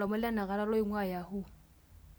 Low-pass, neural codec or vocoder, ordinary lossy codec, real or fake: none; none; none; real